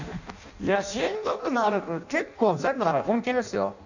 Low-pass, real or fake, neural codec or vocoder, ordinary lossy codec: 7.2 kHz; fake; codec, 16 kHz in and 24 kHz out, 0.6 kbps, FireRedTTS-2 codec; none